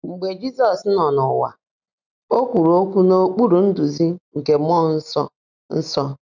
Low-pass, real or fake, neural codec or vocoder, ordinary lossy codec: 7.2 kHz; real; none; none